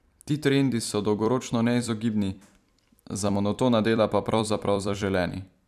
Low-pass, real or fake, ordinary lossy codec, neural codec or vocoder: 14.4 kHz; fake; none; vocoder, 44.1 kHz, 128 mel bands every 256 samples, BigVGAN v2